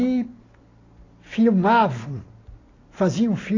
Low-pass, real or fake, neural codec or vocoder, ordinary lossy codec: 7.2 kHz; real; none; AAC, 32 kbps